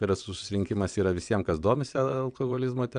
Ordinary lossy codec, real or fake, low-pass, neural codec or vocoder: AAC, 96 kbps; fake; 9.9 kHz; vocoder, 22.05 kHz, 80 mel bands, WaveNeXt